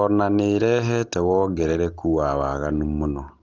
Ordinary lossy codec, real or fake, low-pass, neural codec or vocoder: Opus, 16 kbps; real; 7.2 kHz; none